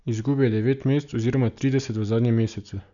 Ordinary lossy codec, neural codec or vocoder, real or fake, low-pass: none; none; real; 7.2 kHz